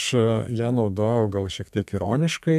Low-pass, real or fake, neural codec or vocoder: 14.4 kHz; fake; codec, 32 kHz, 1.9 kbps, SNAC